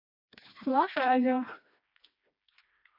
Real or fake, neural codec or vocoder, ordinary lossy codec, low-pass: fake; codec, 16 kHz, 2 kbps, FreqCodec, smaller model; MP3, 32 kbps; 5.4 kHz